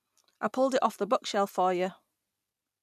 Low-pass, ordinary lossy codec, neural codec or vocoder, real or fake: 14.4 kHz; none; none; real